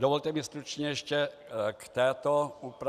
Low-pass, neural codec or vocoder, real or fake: 14.4 kHz; none; real